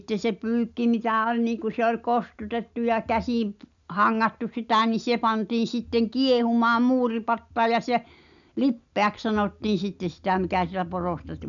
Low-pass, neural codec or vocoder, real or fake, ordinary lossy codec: 7.2 kHz; none; real; none